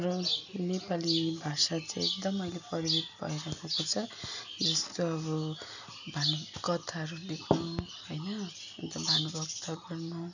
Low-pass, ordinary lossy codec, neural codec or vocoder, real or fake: 7.2 kHz; none; none; real